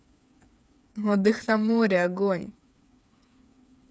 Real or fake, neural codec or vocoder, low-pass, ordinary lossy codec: fake; codec, 16 kHz, 8 kbps, FreqCodec, smaller model; none; none